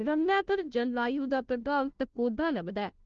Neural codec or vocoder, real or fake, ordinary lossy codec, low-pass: codec, 16 kHz, 0.5 kbps, FunCodec, trained on Chinese and English, 25 frames a second; fake; Opus, 16 kbps; 7.2 kHz